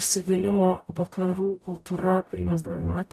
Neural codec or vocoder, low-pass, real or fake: codec, 44.1 kHz, 0.9 kbps, DAC; 14.4 kHz; fake